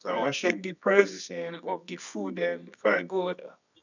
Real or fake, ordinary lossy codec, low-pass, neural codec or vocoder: fake; none; 7.2 kHz; codec, 24 kHz, 0.9 kbps, WavTokenizer, medium music audio release